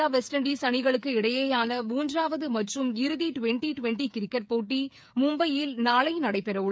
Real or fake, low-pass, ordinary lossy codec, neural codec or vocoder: fake; none; none; codec, 16 kHz, 8 kbps, FreqCodec, smaller model